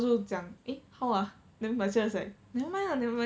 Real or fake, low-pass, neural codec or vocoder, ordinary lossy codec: real; none; none; none